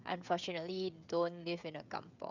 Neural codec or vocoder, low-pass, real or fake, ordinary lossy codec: codec, 16 kHz, 16 kbps, FreqCodec, smaller model; 7.2 kHz; fake; none